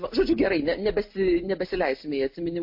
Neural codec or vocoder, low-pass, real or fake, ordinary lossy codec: none; 5.4 kHz; real; MP3, 32 kbps